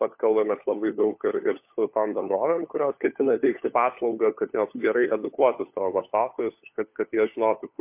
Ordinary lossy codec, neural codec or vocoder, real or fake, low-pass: MP3, 32 kbps; codec, 16 kHz, 4 kbps, FunCodec, trained on LibriTTS, 50 frames a second; fake; 3.6 kHz